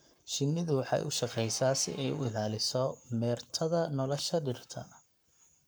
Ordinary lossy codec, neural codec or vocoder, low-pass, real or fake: none; codec, 44.1 kHz, 7.8 kbps, Pupu-Codec; none; fake